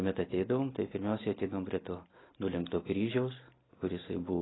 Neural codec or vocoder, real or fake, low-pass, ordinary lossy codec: none; real; 7.2 kHz; AAC, 16 kbps